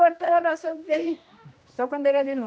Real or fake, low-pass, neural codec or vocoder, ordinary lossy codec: fake; none; codec, 16 kHz, 1 kbps, X-Codec, HuBERT features, trained on general audio; none